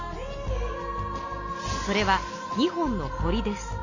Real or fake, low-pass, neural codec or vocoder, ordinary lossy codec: real; 7.2 kHz; none; AAC, 48 kbps